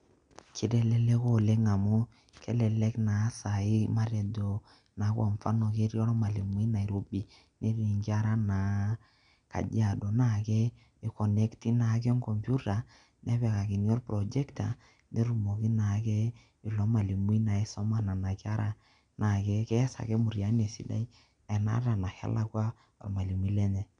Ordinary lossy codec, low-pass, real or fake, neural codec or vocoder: none; 9.9 kHz; real; none